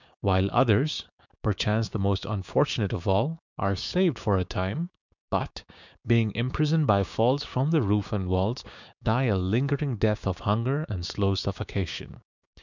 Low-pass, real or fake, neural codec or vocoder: 7.2 kHz; fake; codec, 16 kHz, 6 kbps, DAC